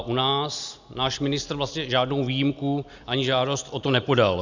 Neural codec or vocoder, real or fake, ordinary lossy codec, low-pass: none; real; Opus, 64 kbps; 7.2 kHz